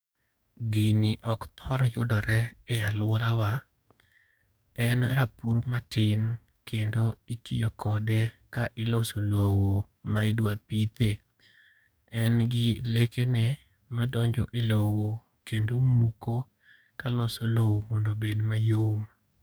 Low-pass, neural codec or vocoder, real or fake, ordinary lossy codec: none; codec, 44.1 kHz, 2.6 kbps, DAC; fake; none